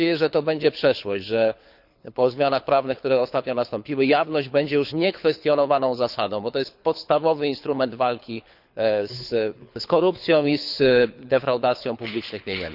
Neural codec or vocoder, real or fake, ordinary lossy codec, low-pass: codec, 24 kHz, 6 kbps, HILCodec; fake; none; 5.4 kHz